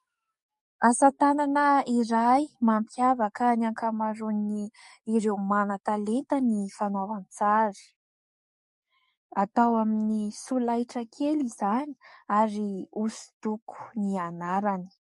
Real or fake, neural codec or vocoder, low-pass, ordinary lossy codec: fake; codec, 44.1 kHz, 7.8 kbps, DAC; 14.4 kHz; MP3, 48 kbps